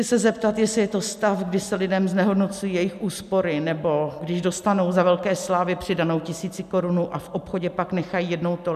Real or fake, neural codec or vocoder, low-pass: real; none; 14.4 kHz